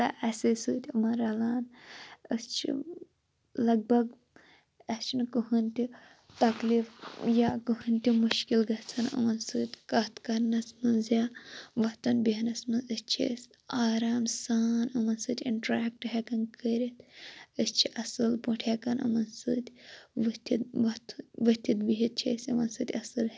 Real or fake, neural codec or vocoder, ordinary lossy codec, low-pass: real; none; none; none